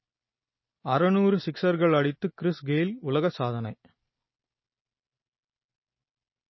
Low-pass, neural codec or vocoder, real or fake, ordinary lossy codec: 7.2 kHz; none; real; MP3, 24 kbps